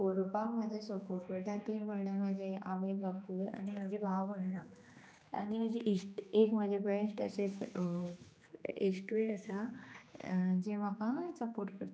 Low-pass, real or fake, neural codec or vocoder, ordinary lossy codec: none; fake; codec, 16 kHz, 2 kbps, X-Codec, HuBERT features, trained on general audio; none